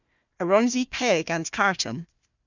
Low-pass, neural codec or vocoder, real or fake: 7.2 kHz; codec, 16 kHz, 1 kbps, FunCodec, trained on Chinese and English, 50 frames a second; fake